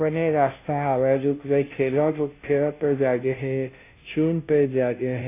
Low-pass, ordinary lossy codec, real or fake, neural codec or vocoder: 3.6 kHz; AAC, 24 kbps; fake; codec, 16 kHz, 0.5 kbps, FunCodec, trained on Chinese and English, 25 frames a second